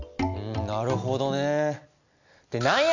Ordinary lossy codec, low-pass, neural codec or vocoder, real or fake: none; 7.2 kHz; none; real